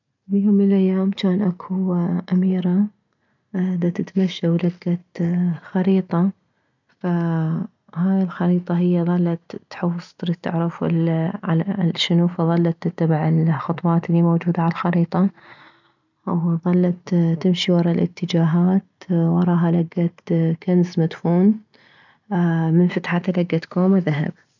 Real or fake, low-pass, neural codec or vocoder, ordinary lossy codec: real; 7.2 kHz; none; none